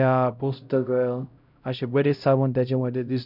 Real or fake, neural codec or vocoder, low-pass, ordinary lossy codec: fake; codec, 16 kHz, 0.5 kbps, X-Codec, HuBERT features, trained on LibriSpeech; 5.4 kHz; none